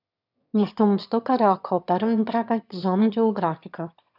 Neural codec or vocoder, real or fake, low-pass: autoencoder, 22.05 kHz, a latent of 192 numbers a frame, VITS, trained on one speaker; fake; 5.4 kHz